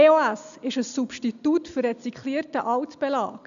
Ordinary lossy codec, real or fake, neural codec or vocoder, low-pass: none; real; none; 7.2 kHz